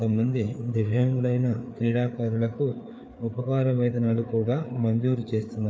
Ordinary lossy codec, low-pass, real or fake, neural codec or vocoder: none; none; fake; codec, 16 kHz, 4 kbps, FreqCodec, larger model